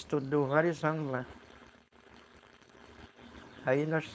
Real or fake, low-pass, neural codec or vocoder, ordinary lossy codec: fake; none; codec, 16 kHz, 4.8 kbps, FACodec; none